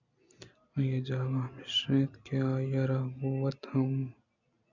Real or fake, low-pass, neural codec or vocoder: real; 7.2 kHz; none